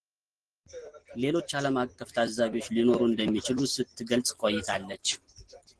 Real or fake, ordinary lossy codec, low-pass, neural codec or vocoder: real; Opus, 16 kbps; 10.8 kHz; none